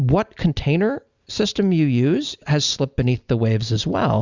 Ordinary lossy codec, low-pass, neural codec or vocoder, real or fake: Opus, 64 kbps; 7.2 kHz; none; real